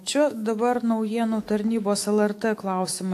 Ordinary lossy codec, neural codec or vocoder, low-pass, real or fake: AAC, 64 kbps; autoencoder, 48 kHz, 128 numbers a frame, DAC-VAE, trained on Japanese speech; 14.4 kHz; fake